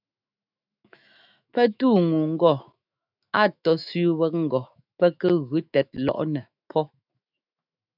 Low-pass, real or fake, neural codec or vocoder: 5.4 kHz; fake; autoencoder, 48 kHz, 128 numbers a frame, DAC-VAE, trained on Japanese speech